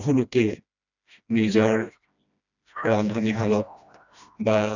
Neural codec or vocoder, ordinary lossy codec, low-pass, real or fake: codec, 16 kHz, 1 kbps, FreqCodec, smaller model; none; 7.2 kHz; fake